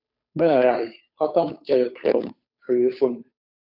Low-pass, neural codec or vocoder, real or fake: 5.4 kHz; codec, 16 kHz, 2 kbps, FunCodec, trained on Chinese and English, 25 frames a second; fake